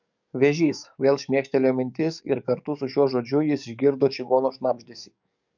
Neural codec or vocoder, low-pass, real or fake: codec, 44.1 kHz, 7.8 kbps, DAC; 7.2 kHz; fake